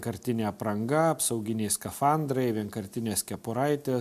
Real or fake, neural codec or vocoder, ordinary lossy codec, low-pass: real; none; MP3, 96 kbps; 14.4 kHz